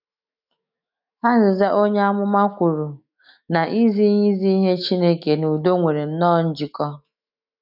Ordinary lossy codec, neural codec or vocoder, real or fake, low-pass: none; autoencoder, 48 kHz, 128 numbers a frame, DAC-VAE, trained on Japanese speech; fake; 5.4 kHz